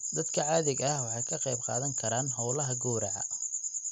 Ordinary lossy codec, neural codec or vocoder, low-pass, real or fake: none; none; 14.4 kHz; real